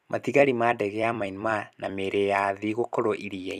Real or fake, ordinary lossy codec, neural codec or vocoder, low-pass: fake; none; vocoder, 44.1 kHz, 128 mel bands every 256 samples, BigVGAN v2; 14.4 kHz